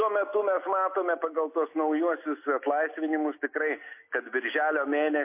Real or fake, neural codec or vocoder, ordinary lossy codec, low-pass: real; none; MP3, 24 kbps; 3.6 kHz